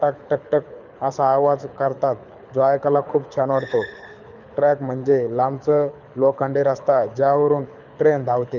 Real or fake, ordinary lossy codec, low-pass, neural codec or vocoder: fake; none; 7.2 kHz; codec, 24 kHz, 6 kbps, HILCodec